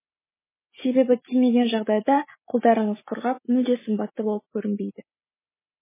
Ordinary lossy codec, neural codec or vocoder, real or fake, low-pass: MP3, 16 kbps; none; real; 3.6 kHz